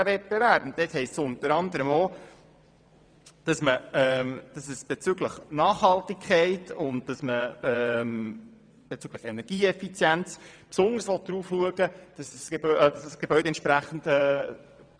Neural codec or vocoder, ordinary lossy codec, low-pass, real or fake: vocoder, 22.05 kHz, 80 mel bands, WaveNeXt; none; 9.9 kHz; fake